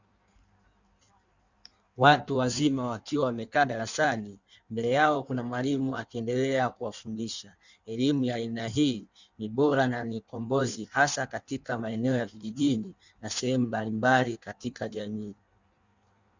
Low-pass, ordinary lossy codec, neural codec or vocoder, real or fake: 7.2 kHz; Opus, 64 kbps; codec, 16 kHz in and 24 kHz out, 1.1 kbps, FireRedTTS-2 codec; fake